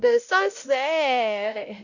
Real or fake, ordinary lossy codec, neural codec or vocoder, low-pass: fake; none; codec, 16 kHz, 0.5 kbps, X-Codec, WavLM features, trained on Multilingual LibriSpeech; 7.2 kHz